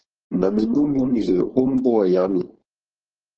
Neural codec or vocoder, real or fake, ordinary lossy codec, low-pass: codec, 16 kHz, 4.8 kbps, FACodec; fake; Opus, 16 kbps; 7.2 kHz